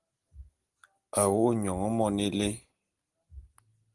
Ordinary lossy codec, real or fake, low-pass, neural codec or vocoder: Opus, 24 kbps; real; 10.8 kHz; none